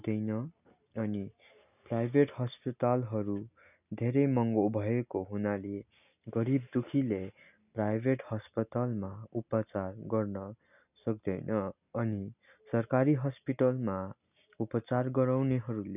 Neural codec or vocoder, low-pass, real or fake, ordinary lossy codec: none; 3.6 kHz; real; none